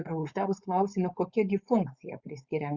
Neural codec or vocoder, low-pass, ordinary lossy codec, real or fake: codec, 16 kHz, 4.8 kbps, FACodec; 7.2 kHz; Opus, 64 kbps; fake